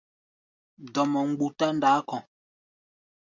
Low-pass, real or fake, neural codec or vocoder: 7.2 kHz; real; none